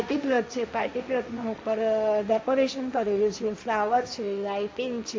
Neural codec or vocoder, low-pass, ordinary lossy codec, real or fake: codec, 16 kHz, 1.1 kbps, Voila-Tokenizer; none; none; fake